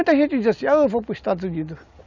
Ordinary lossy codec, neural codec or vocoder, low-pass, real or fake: none; none; 7.2 kHz; real